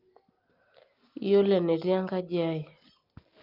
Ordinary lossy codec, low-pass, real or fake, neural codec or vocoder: Opus, 32 kbps; 5.4 kHz; real; none